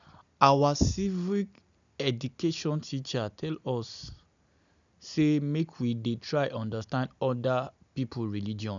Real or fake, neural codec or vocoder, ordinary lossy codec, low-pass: real; none; none; 7.2 kHz